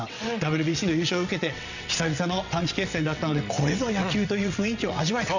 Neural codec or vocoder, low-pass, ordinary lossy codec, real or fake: vocoder, 22.05 kHz, 80 mel bands, WaveNeXt; 7.2 kHz; none; fake